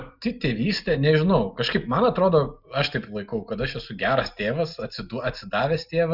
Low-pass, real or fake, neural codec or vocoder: 5.4 kHz; real; none